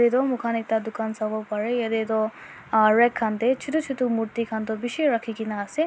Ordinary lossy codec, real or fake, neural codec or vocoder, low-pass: none; real; none; none